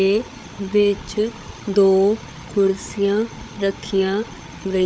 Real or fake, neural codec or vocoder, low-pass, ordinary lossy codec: fake; codec, 16 kHz, 8 kbps, FreqCodec, larger model; none; none